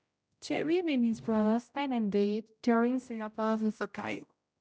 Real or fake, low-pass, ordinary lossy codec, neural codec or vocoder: fake; none; none; codec, 16 kHz, 0.5 kbps, X-Codec, HuBERT features, trained on general audio